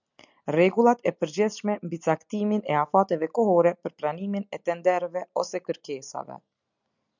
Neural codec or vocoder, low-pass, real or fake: none; 7.2 kHz; real